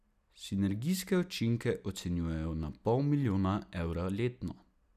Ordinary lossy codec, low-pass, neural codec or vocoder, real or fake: none; 14.4 kHz; none; real